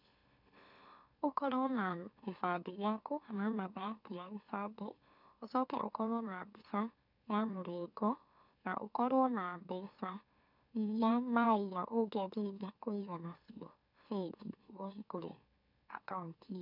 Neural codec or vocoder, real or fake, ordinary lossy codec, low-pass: autoencoder, 44.1 kHz, a latent of 192 numbers a frame, MeloTTS; fake; none; 5.4 kHz